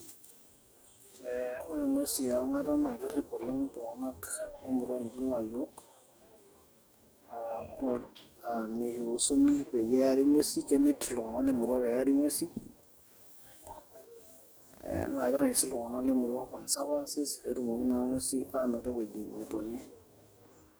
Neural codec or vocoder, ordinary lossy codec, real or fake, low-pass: codec, 44.1 kHz, 2.6 kbps, DAC; none; fake; none